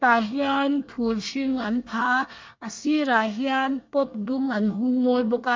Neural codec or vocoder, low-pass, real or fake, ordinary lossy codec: codec, 24 kHz, 1 kbps, SNAC; 7.2 kHz; fake; MP3, 64 kbps